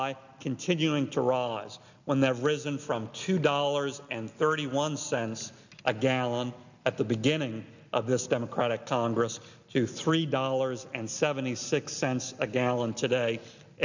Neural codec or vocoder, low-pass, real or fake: none; 7.2 kHz; real